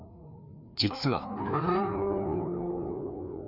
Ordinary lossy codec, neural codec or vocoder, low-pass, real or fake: none; codec, 16 kHz, 2 kbps, FreqCodec, larger model; 5.4 kHz; fake